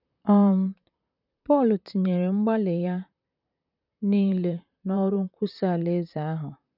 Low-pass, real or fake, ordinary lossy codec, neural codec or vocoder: 5.4 kHz; fake; none; vocoder, 44.1 kHz, 128 mel bands, Pupu-Vocoder